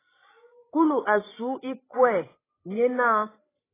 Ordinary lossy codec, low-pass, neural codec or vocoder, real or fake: AAC, 16 kbps; 3.6 kHz; codec, 16 kHz, 16 kbps, FreqCodec, larger model; fake